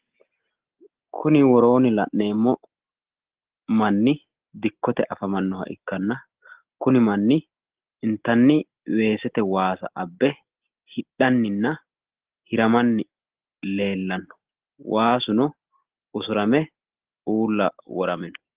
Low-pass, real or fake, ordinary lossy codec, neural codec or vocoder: 3.6 kHz; real; Opus, 16 kbps; none